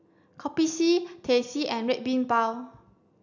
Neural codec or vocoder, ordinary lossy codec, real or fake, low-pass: none; none; real; 7.2 kHz